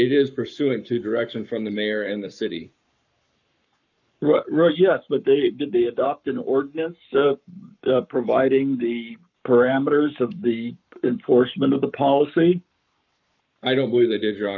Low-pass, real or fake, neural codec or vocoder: 7.2 kHz; fake; codec, 44.1 kHz, 7.8 kbps, Pupu-Codec